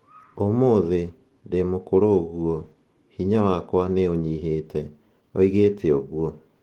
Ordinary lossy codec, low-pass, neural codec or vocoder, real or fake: Opus, 16 kbps; 19.8 kHz; autoencoder, 48 kHz, 128 numbers a frame, DAC-VAE, trained on Japanese speech; fake